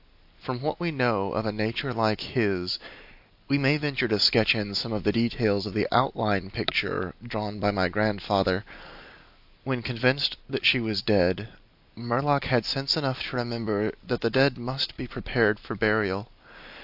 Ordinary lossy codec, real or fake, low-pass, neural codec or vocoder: AAC, 48 kbps; real; 5.4 kHz; none